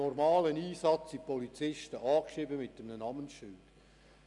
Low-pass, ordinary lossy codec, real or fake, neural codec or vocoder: 10.8 kHz; none; real; none